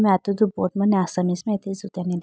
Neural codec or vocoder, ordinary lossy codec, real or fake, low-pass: none; none; real; none